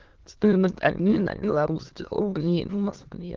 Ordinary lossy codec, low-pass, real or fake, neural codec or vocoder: Opus, 16 kbps; 7.2 kHz; fake; autoencoder, 22.05 kHz, a latent of 192 numbers a frame, VITS, trained on many speakers